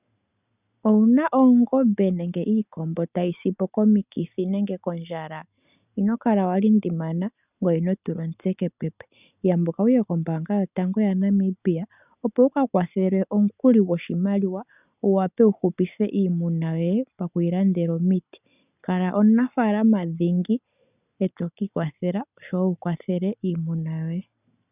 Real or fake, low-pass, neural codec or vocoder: real; 3.6 kHz; none